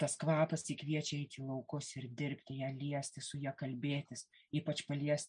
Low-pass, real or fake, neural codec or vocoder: 9.9 kHz; real; none